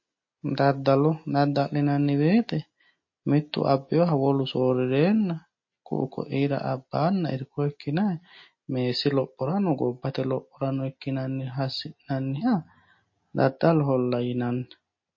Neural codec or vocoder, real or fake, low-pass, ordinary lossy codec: none; real; 7.2 kHz; MP3, 32 kbps